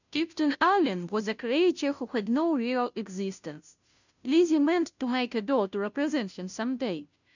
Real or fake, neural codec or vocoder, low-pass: fake; codec, 16 kHz, 0.5 kbps, FunCodec, trained on Chinese and English, 25 frames a second; 7.2 kHz